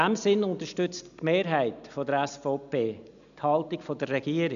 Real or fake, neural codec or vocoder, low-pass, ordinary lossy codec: real; none; 7.2 kHz; none